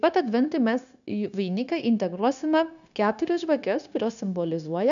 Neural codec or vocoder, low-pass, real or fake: codec, 16 kHz, 0.9 kbps, LongCat-Audio-Codec; 7.2 kHz; fake